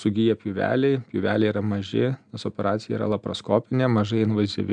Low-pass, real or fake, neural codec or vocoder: 9.9 kHz; real; none